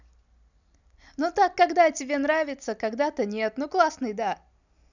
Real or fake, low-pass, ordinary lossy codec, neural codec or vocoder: real; 7.2 kHz; none; none